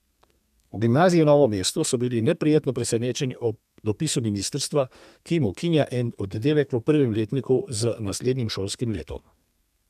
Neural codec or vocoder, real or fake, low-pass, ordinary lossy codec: codec, 32 kHz, 1.9 kbps, SNAC; fake; 14.4 kHz; none